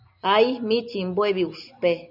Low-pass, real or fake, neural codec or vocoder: 5.4 kHz; real; none